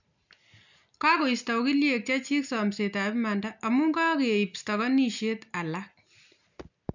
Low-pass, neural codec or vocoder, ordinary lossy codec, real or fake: 7.2 kHz; none; none; real